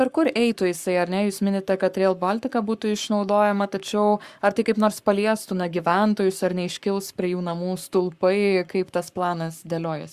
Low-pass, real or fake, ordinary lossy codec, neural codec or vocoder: 14.4 kHz; fake; Opus, 64 kbps; codec, 44.1 kHz, 7.8 kbps, Pupu-Codec